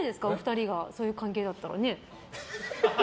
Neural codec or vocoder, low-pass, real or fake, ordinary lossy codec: none; none; real; none